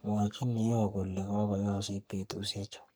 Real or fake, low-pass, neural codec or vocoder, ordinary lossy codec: fake; none; codec, 44.1 kHz, 3.4 kbps, Pupu-Codec; none